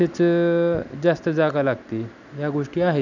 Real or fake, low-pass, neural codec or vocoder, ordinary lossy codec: real; 7.2 kHz; none; none